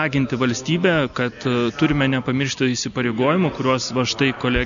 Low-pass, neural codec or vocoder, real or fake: 7.2 kHz; none; real